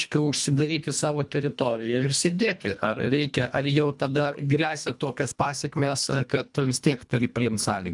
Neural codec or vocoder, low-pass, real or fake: codec, 24 kHz, 1.5 kbps, HILCodec; 10.8 kHz; fake